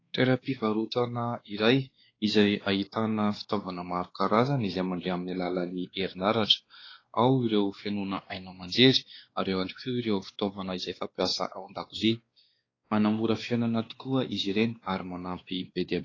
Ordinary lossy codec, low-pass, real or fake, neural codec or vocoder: AAC, 32 kbps; 7.2 kHz; fake; codec, 16 kHz, 2 kbps, X-Codec, WavLM features, trained on Multilingual LibriSpeech